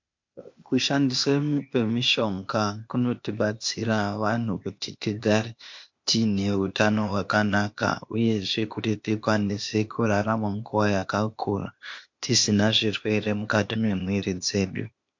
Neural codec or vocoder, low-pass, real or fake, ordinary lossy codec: codec, 16 kHz, 0.8 kbps, ZipCodec; 7.2 kHz; fake; MP3, 48 kbps